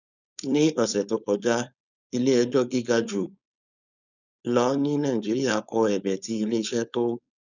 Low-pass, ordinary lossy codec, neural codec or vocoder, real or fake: 7.2 kHz; none; codec, 16 kHz, 4.8 kbps, FACodec; fake